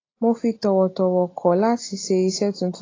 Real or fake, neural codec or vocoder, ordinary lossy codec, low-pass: real; none; AAC, 32 kbps; 7.2 kHz